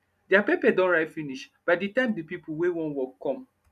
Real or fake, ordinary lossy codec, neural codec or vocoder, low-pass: real; none; none; 14.4 kHz